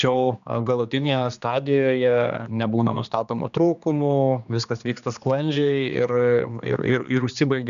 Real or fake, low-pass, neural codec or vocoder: fake; 7.2 kHz; codec, 16 kHz, 2 kbps, X-Codec, HuBERT features, trained on general audio